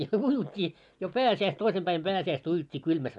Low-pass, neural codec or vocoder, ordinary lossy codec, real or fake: 10.8 kHz; none; none; real